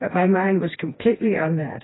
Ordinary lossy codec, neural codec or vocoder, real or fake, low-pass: AAC, 16 kbps; codec, 16 kHz, 2 kbps, FreqCodec, smaller model; fake; 7.2 kHz